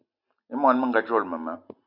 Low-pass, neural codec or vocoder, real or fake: 5.4 kHz; none; real